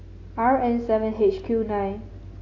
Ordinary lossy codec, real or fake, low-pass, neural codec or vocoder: AAC, 32 kbps; real; 7.2 kHz; none